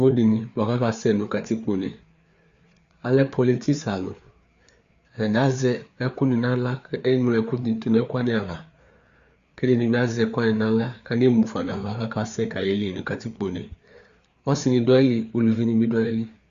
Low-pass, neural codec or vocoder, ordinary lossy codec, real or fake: 7.2 kHz; codec, 16 kHz, 4 kbps, FreqCodec, larger model; Opus, 64 kbps; fake